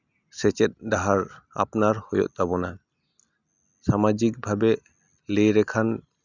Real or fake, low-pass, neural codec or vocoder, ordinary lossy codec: real; 7.2 kHz; none; none